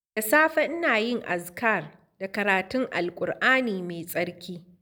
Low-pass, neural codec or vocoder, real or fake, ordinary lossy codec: none; none; real; none